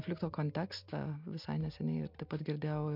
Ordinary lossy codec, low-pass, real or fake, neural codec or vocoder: MP3, 48 kbps; 5.4 kHz; real; none